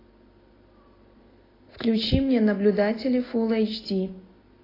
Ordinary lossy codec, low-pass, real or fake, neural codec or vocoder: AAC, 24 kbps; 5.4 kHz; real; none